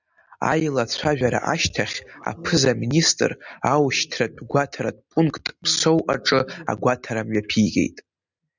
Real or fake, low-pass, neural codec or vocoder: real; 7.2 kHz; none